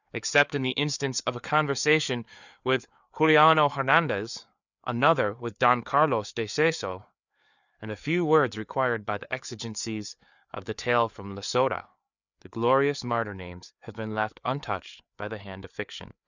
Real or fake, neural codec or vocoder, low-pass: fake; codec, 16 kHz, 4 kbps, FreqCodec, larger model; 7.2 kHz